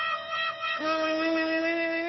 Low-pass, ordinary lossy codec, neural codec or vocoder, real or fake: 7.2 kHz; MP3, 24 kbps; codec, 16 kHz, 2 kbps, FunCodec, trained on Chinese and English, 25 frames a second; fake